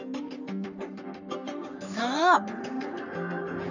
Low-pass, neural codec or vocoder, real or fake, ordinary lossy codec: 7.2 kHz; codec, 44.1 kHz, 3.4 kbps, Pupu-Codec; fake; none